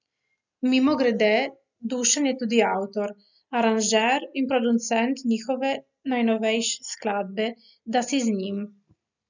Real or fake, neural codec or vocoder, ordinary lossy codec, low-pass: real; none; none; 7.2 kHz